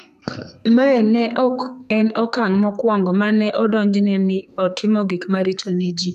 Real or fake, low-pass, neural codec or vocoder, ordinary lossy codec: fake; 14.4 kHz; codec, 32 kHz, 1.9 kbps, SNAC; none